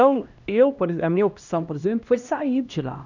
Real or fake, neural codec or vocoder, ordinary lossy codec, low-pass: fake; codec, 16 kHz, 1 kbps, X-Codec, HuBERT features, trained on LibriSpeech; none; 7.2 kHz